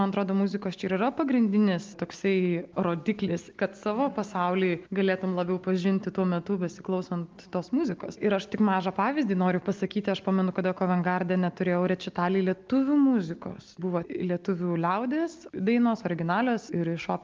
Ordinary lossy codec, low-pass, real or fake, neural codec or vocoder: Opus, 24 kbps; 7.2 kHz; real; none